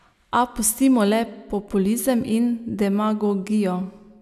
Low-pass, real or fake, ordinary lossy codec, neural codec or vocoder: 14.4 kHz; real; none; none